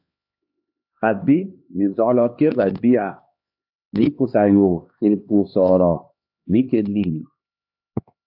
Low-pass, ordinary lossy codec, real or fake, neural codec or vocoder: 5.4 kHz; AAC, 48 kbps; fake; codec, 16 kHz, 2 kbps, X-Codec, HuBERT features, trained on LibriSpeech